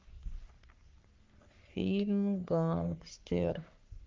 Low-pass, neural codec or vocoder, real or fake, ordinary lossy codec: 7.2 kHz; codec, 44.1 kHz, 3.4 kbps, Pupu-Codec; fake; Opus, 24 kbps